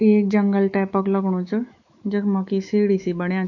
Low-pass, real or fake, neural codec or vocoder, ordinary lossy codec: 7.2 kHz; fake; autoencoder, 48 kHz, 128 numbers a frame, DAC-VAE, trained on Japanese speech; AAC, 48 kbps